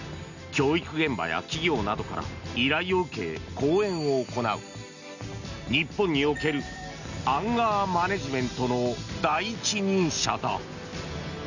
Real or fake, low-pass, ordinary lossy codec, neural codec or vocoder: real; 7.2 kHz; none; none